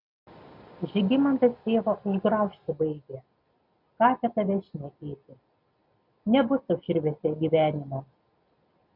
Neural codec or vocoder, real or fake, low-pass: none; real; 5.4 kHz